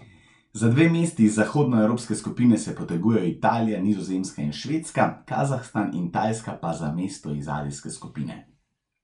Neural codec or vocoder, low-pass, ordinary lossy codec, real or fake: none; 10.8 kHz; none; real